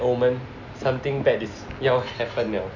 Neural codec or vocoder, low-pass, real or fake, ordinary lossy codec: none; 7.2 kHz; real; none